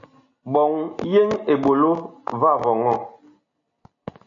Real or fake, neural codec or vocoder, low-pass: real; none; 7.2 kHz